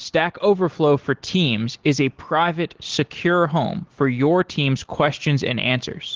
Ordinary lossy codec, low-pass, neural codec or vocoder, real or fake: Opus, 16 kbps; 7.2 kHz; none; real